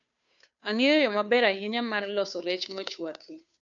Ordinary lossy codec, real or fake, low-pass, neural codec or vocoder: none; fake; 7.2 kHz; codec, 16 kHz, 2 kbps, FunCodec, trained on Chinese and English, 25 frames a second